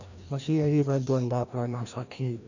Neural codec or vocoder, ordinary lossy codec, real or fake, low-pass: codec, 16 kHz, 1 kbps, FreqCodec, larger model; none; fake; 7.2 kHz